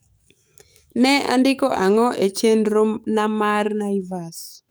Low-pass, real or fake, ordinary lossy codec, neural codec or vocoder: none; fake; none; codec, 44.1 kHz, 7.8 kbps, DAC